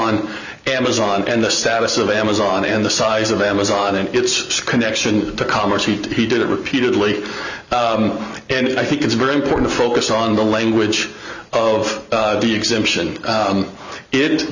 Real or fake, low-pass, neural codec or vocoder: real; 7.2 kHz; none